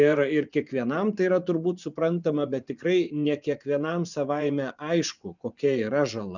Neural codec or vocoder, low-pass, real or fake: vocoder, 24 kHz, 100 mel bands, Vocos; 7.2 kHz; fake